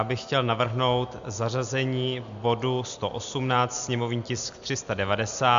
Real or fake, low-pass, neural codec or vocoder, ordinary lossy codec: real; 7.2 kHz; none; MP3, 64 kbps